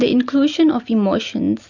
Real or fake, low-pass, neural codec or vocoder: real; 7.2 kHz; none